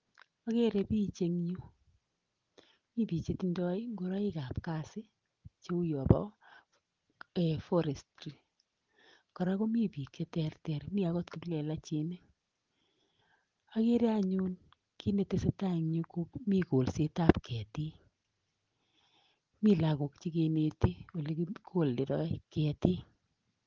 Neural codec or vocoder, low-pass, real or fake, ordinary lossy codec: none; 7.2 kHz; real; Opus, 16 kbps